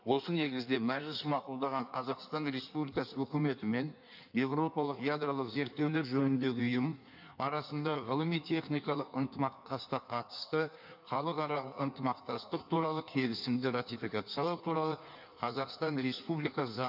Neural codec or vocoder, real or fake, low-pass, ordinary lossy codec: codec, 16 kHz in and 24 kHz out, 1.1 kbps, FireRedTTS-2 codec; fake; 5.4 kHz; none